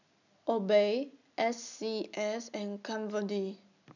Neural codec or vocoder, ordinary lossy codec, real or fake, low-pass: none; none; real; 7.2 kHz